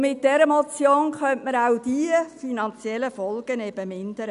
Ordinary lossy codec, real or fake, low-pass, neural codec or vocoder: none; real; 10.8 kHz; none